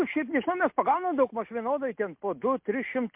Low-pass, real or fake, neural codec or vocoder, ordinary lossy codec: 3.6 kHz; real; none; MP3, 32 kbps